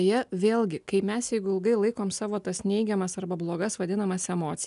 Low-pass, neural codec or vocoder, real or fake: 10.8 kHz; none; real